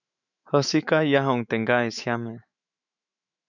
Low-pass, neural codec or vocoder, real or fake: 7.2 kHz; autoencoder, 48 kHz, 128 numbers a frame, DAC-VAE, trained on Japanese speech; fake